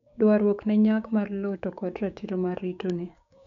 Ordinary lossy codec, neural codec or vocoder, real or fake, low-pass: none; codec, 16 kHz, 6 kbps, DAC; fake; 7.2 kHz